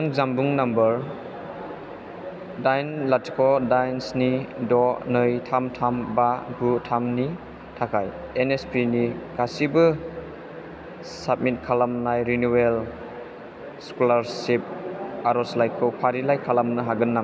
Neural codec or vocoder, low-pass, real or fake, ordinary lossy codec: none; none; real; none